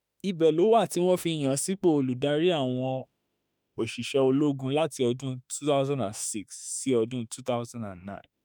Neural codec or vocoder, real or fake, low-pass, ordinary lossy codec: autoencoder, 48 kHz, 32 numbers a frame, DAC-VAE, trained on Japanese speech; fake; none; none